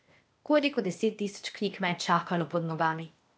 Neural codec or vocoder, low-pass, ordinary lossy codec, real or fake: codec, 16 kHz, 0.8 kbps, ZipCodec; none; none; fake